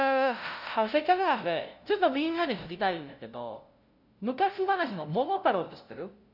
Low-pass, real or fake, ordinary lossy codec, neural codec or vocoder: 5.4 kHz; fake; none; codec, 16 kHz, 0.5 kbps, FunCodec, trained on LibriTTS, 25 frames a second